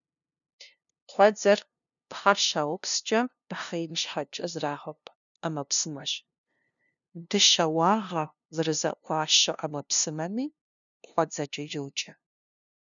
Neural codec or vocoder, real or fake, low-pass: codec, 16 kHz, 0.5 kbps, FunCodec, trained on LibriTTS, 25 frames a second; fake; 7.2 kHz